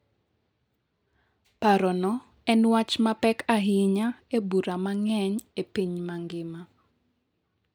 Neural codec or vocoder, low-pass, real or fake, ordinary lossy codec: none; none; real; none